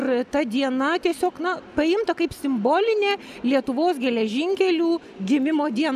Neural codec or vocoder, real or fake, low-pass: none; real; 14.4 kHz